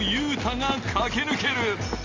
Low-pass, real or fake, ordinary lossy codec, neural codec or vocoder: 7.2 kHz; real; Opus, 32 kbps; none